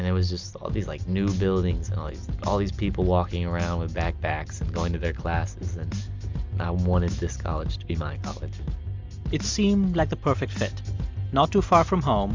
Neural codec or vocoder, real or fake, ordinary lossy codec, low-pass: none; real; MP3, 64 kbps; 7.2 kHz